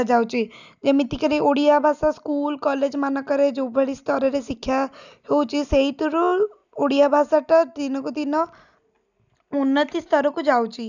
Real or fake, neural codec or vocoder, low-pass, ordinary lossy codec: real; none; 7.2 kHz; none